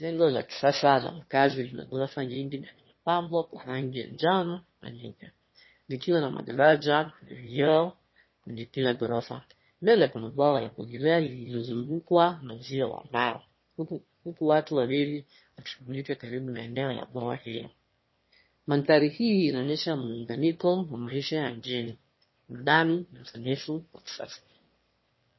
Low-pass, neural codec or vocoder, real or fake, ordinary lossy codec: 7.2 kHz; autoencoder, 22.05 kHz, a latent of 192 numbers a frame, VITS, trained on one speaker; fake; MP3, 24 kbps